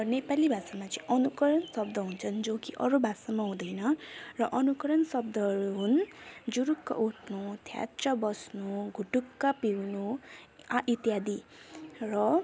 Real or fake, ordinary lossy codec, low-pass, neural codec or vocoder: real; none; none; none